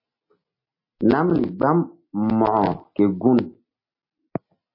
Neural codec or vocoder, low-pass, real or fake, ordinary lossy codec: none; 5.4 kHz; real; MP3, 24 kbps